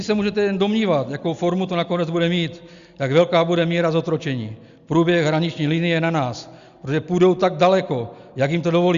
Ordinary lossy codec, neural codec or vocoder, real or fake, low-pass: Opus, 64 kbps; none; real; 7.2 kHz